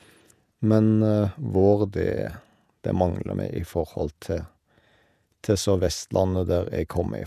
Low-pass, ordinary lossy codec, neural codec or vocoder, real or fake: 14.4 kHz; none; vocoder, 48 kHz, 128 mel bands, Vocos; fake